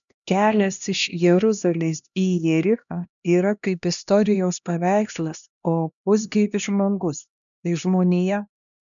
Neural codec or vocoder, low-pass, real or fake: codec, 16 kHz, 1 kbps, X-Codec, HuBERT features, trained on LibriSpeech; 7.2 kHz; fake